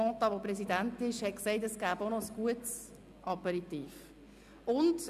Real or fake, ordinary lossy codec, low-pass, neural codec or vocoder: real; none; 14.4 kHz; none